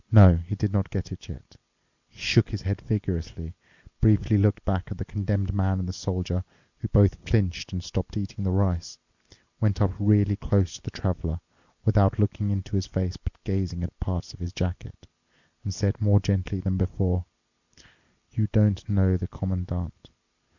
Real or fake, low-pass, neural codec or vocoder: real; 7.2 kHz; none